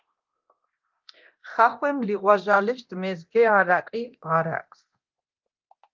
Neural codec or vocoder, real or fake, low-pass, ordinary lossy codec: codec, 24 kHz, 0.9 kbps, DualCodec; fake; 7.2 kHz; Opus, 32 kbps